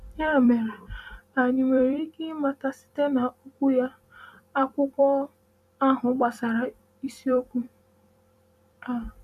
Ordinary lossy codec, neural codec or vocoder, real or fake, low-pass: none; vocoder, 44.1 kHz, 128 mel bands every 256 samples, BigVGAN v2; fake; 14.4 kHz